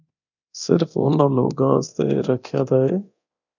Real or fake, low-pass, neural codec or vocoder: fake; 7.2 kHz; codec, 24 kHz, 0.9 kbps, DualCodec